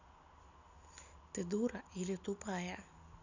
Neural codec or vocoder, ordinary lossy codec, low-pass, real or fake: none; none; 7.2 kHz; real